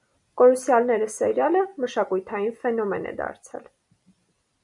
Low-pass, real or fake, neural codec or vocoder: 10.8 kHz; real; none